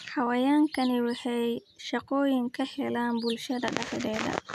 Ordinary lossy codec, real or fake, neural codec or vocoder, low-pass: none; real; none; 14.4 kHz